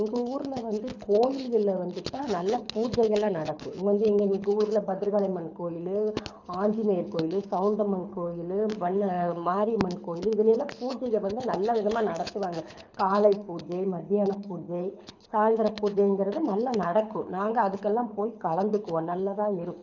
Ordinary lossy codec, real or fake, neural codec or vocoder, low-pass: none; fake; codec, 24 kHz, 6 kbps, HILCodec; 7.2 kHz